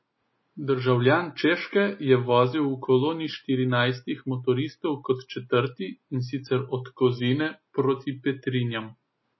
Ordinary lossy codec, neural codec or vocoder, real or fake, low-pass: MP3, 24 kbps; none; real; 7.2 kHz